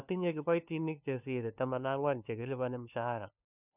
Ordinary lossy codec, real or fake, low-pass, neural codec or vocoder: none; fake; 3.6 kHz; codec, 16 kHz, 2 kbps, FunCodec, trained on LibriTTS, 25 frames a second